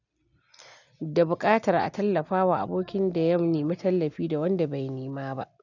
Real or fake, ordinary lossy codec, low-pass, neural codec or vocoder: real; none; 7.2 kHz; none